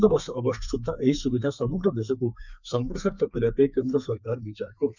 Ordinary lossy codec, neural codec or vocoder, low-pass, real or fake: none; codec, 32 kHz, 1.9 kbps, SNAC; 7.2 kHz; fake